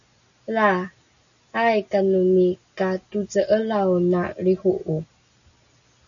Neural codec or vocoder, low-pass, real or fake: none; 7.2 kHz; real